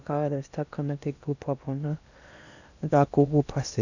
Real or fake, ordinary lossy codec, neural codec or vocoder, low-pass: fake; none; codec, 16 kHz in and 24 kHz out, 0.8 kbps, FocalCodec, streaming, 65536 codes; 7.2 kHz